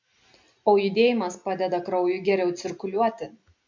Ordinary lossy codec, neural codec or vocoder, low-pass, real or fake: MP3, 64 kbps; none; 7.2 kHz; real